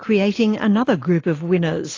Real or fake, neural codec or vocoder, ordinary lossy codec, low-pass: real; none; AAC, 32 kbps; 7.2 kHz